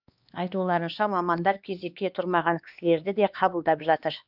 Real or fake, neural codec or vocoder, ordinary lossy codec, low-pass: fake; codec, 16 kHz, 2 kbps, X-Codec, HuBERT features, trained on LibriSpeech; none; 5.4 kHz